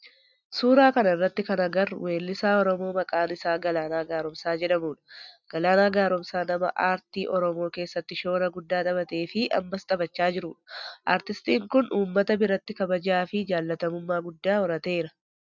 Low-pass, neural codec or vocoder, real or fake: 7.2 kHz; none; real